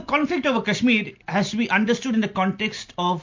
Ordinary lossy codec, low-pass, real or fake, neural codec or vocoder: MP3, 48 kbps; 7.2 kHz; real; none